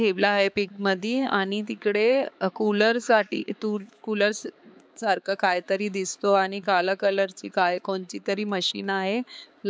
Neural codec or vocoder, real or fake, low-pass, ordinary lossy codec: codec, 16 kHz, 4 kbps, X-Codec, HuBERT features, trained on balanced general audio; fake; none; none